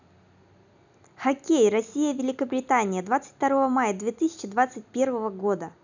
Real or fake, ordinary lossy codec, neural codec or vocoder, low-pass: real; none; none; 7.2 kHz